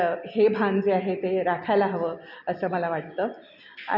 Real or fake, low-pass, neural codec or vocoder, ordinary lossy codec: real; 5.4 kHz; none; none